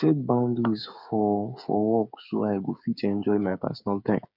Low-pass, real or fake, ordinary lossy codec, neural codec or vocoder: 5.4 kHz; fake; none; codec, 16 kHz, 8 kbps, FreqCodec, smaller model